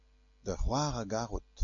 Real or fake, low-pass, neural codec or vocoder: real; 7.2 kHz; none